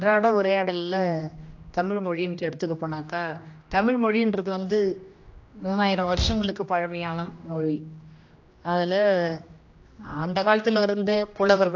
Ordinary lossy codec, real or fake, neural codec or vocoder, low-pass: AAC, 48 kbps; fake; codec, 16 kHz, 1 kbps, X-Codec, HuBERT features, trained on general audio; 7.2 kHz